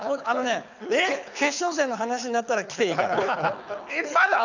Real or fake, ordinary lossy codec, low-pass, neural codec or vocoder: fake; none; 7.2 kHz; codec, 24 kHz, 6 kbps, HILCodec